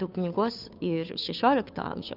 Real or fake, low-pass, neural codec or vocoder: fake; 5.4 kHz; codec, 16 kHz, 2 kbps, FunCodec, trained on Chinese and English, 25 frames a second